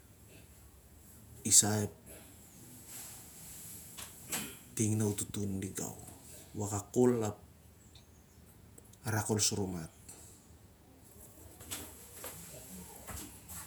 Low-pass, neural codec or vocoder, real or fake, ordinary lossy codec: none; vocoder, 48 kHz, 128 mel bands, Vocos; fake; none